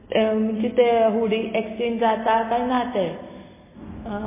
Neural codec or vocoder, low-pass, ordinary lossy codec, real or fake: none; 3.6 kHz; MP3, 16 kbps; real